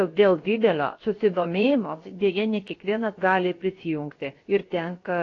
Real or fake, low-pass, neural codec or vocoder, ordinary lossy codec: fake; 7.2 kHz; codec, 16 kHz, about 1 kbps, DyCAST, with the encoder's durations; AAC, 32 kbps